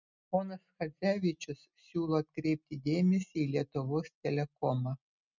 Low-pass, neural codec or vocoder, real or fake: 7.2 kHz; none; real